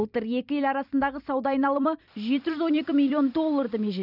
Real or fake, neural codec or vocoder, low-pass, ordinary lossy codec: fake; vocoder, 44.1 kHz, 128 mel bands every 256 samples, BigVGAN v2; 5.4 kHz; none